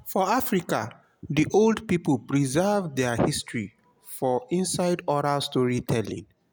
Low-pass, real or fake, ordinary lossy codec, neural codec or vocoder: none; real; none; none